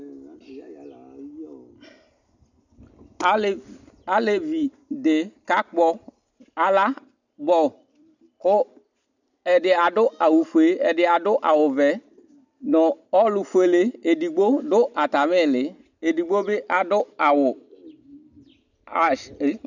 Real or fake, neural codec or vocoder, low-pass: real; none; 7.2 kHz